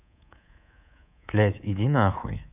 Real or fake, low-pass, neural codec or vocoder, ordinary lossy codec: fake; 3.6 kHz; codec, 24 kHz, 3.1 kbps, DualCodec; none